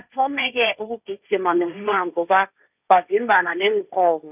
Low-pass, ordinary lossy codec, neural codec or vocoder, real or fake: 3.6 kHz; none; codec, 16 kHz, 1.1 kbps, Voila-Tokenizer; fake